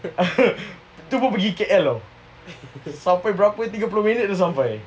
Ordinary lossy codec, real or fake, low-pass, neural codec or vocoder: none; real; none; none